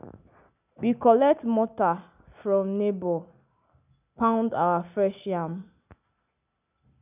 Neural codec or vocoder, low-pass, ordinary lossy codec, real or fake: none; 3.6 kHz; none; real